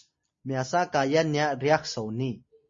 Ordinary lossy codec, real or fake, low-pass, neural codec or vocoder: MP3, 32 kbps; real; 7.2 kHz; none